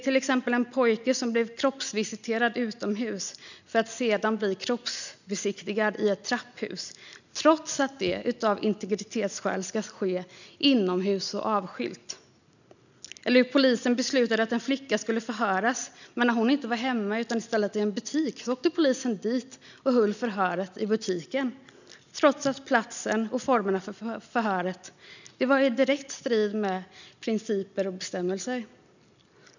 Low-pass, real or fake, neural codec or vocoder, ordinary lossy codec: 7.2 kHz; real; none; none